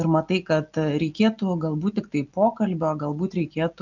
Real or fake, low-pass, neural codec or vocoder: real; 7.2 kHz; none